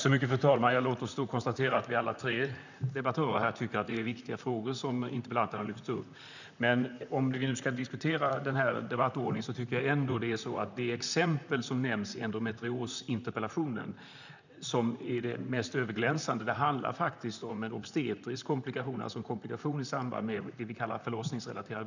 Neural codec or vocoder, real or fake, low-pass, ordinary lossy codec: vocoder, 44.1 kHz, 128 mel bands, Pupu-Vocoder; fake; 7.2 kHz; none